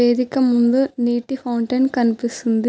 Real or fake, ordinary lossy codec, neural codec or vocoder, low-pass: real; none; none; none